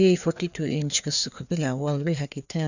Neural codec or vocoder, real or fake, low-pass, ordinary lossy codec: codec, 16 kHz, 2 kbps, FreqCodec, larger model; fake; 7.2 kHz; none